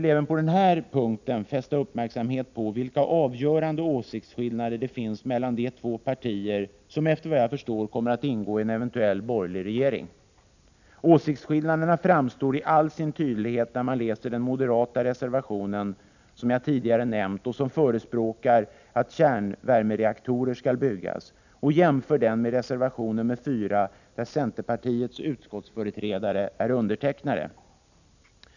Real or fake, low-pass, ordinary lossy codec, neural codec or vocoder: real; 7.2 kHz; none; none